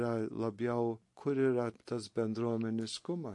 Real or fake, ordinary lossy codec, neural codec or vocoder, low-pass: real; MP3, 48 kbps; none; 10.8 kHz